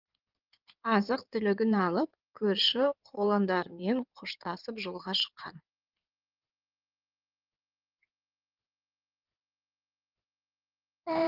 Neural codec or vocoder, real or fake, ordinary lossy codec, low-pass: codec, 16 kHz in and 24 kHz out, 2.2 kbps, FireRedTTS-2 codec; fake; Opus, 32 kbps; 5.4 kHz